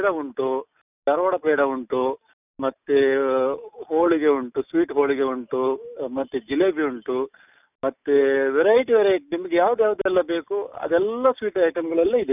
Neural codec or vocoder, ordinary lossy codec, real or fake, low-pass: none; none; real; 3.6 kHz